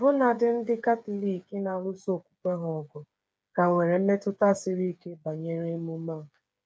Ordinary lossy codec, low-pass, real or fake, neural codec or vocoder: none; none; fake; codec, 16 kHz, 8 kbps, FreqCodec, smaller model